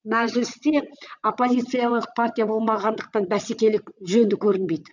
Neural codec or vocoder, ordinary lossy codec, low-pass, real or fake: vocoder, 22.05 kHz, 80 mel bands, WaveNeXt; none; 7.2 kHz; fake